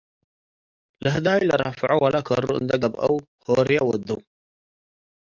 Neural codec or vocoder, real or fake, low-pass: codec, 44.1 kHz, 7.8 kbps, DAC; fake; 7.2 kHz